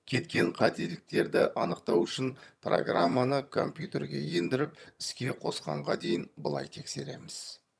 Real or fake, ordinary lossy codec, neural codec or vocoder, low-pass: fake; none; vocoder, 22.05 kHz, 80 mel bands, HiFi-GAN; none